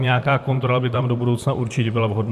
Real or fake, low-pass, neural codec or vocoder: fake; 14.4 kHz; vocoder, 44.1 kHz, 128 mel bands, Pupu-Vocoder